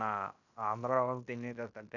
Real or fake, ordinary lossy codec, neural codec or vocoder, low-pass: fake; none; codec, 16 kHz in and 24 kHz out, 0.9 kbps, LongCat-Audio-Codec, fine tuned four codebook decoder; 7.2 kHz